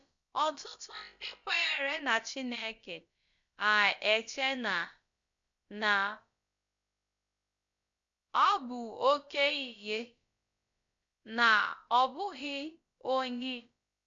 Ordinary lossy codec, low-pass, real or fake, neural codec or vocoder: MP3, 64 kbps; 7.2 kHz; fake; codec, 16 kHz, about 1 kbps, DyCAST, with the encoder's durations